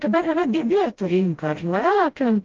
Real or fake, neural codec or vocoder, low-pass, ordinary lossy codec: fake; codec, 16 kHz, 0.5 kbps, FreqCodec, smaller model; 7.2 kHz; Opus, 32 kbps